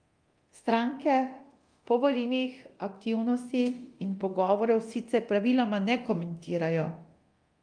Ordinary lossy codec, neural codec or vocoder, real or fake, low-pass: Opus, 32 kbps; codec, 24 kHz, 0.9 kbps, DualCodec; fake; 9.9 kHz